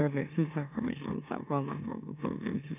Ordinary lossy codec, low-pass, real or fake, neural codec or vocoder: none; 3.6 kHz; fake; autoencoder, 44.1 kHz, a latent of 192 numbers a frame, MeloTTS